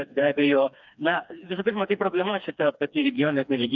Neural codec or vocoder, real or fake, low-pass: codec, 16 kHz, 2 kbps, FreqCodec, smaller model; fake; 7.2 kHz